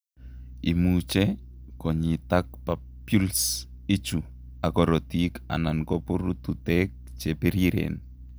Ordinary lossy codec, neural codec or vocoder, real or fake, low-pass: none; none; real; none